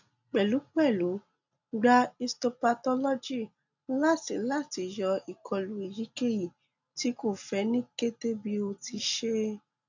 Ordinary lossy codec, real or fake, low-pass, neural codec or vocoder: none; real; 7.2 kHz; none